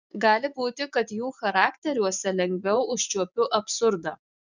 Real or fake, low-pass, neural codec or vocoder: fake; 7.2 kHz; vocoder, 24 kHz, 100 mel bands, Vocos